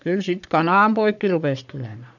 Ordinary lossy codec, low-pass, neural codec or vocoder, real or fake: none; 7.2 kHz; codec, 44.1 kHz, 3.4 kbps, Pupu-Codec; fake